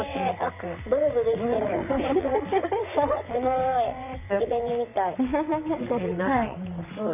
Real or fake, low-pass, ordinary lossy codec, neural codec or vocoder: fake; 3.6 kHz; none; vocoder, 22.05 kHz, 80 mel bands, WaveNeXt